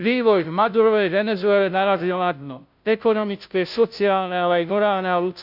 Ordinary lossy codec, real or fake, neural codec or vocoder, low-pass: none; fake; codec, 16 kHz, 0.5 kbps, FunCodec, trained on Chinese and English, 25 frames a second; 5.4 kHz